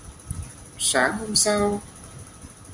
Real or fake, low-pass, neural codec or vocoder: real; 10.8 kHz; none